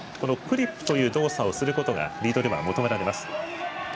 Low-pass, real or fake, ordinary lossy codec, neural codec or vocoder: none; real; none; none